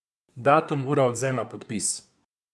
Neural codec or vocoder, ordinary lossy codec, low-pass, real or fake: codec, 24 kHz, 1 kbps, SNAC; none; none; fake